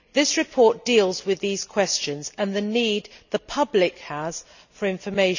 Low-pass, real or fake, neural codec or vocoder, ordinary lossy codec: 7.2 kHz; real; none; none